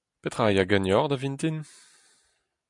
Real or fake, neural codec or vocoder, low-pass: real; none; 10.8 kHz